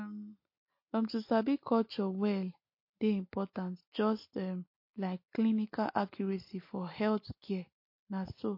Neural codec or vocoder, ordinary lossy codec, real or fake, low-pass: none; MP3, 32 kbps; real; 5.4 kHz